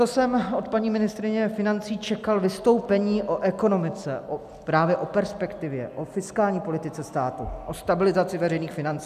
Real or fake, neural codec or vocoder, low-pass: real; none; 14.4 kHz